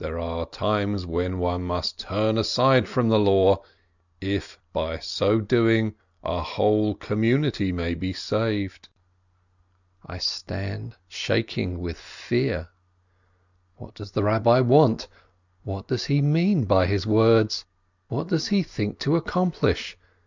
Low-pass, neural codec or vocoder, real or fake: 7.2 kHz; none; real